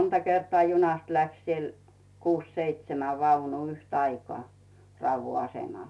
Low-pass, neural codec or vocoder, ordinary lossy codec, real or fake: none; none; none; real